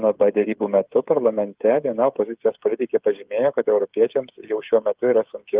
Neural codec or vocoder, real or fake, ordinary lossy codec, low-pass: codec, 16 kHz, 8 kbps, FreqCodec, smaller model; fake; Opus, 32 kbps; 3.6 kHz